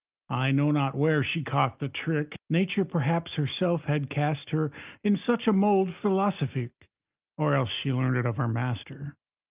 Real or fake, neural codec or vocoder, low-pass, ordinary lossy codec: real; none; 3.6 kHz; Opus, 32 kbps